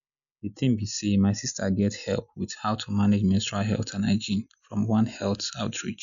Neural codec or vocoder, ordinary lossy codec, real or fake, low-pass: none; none; real; 7.2 kHz